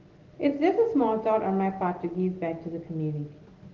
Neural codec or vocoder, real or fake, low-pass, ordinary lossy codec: none; real; 7.2 kHz; Opus, 16 kbps